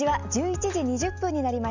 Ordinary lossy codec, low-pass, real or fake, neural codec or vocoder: none; 7.2 kHz; real; none